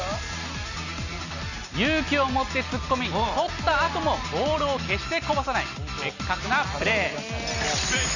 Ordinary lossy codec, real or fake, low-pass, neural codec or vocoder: none; real; 7.2 kHz; none